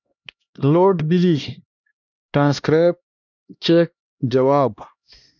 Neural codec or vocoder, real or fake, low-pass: codec, 16 kHz, 1 kbps, X-Codec, HuBERT features, trained on LibriSpeech; fake; 7.2 kHz